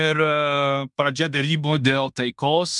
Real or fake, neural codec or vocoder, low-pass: fake; codec, 16 kHz in and 24 kHz out, 0.9 kbps, LongCat-Audio-Codec, fine tuned four codebook decoder; 10.8 kHz